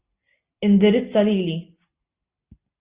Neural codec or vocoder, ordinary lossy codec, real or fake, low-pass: none; Opus, 64 kbps; real; 3.6 kHz